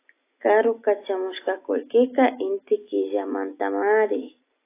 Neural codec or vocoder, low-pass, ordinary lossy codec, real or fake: none; 3.6 kHz; AAC, 24 kbps; real